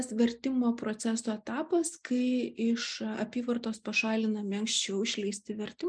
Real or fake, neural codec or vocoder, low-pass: real; none; 9.9 kHz